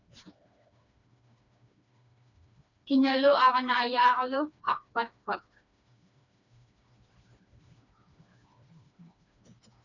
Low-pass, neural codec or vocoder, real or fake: 7.2 kHz; codec, 16 kHz, 2 kbps, FreqCodec, smaller model; fake